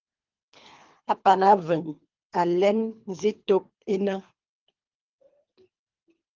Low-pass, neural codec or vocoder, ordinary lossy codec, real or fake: 7.2 kHz; codec, 24 kHz, 3 kbps, HILCodec; Opus, 32 kbps; fake